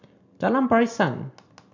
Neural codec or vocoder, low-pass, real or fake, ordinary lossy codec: none; 7.2 kHz; real; AAC, 48 kbps